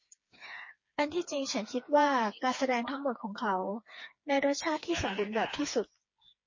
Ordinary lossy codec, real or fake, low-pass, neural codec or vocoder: MP3, 32 kbps; fake; 7.2 kHz; codec, 16 kHz, 4 kbps, FreqCodec, smaller model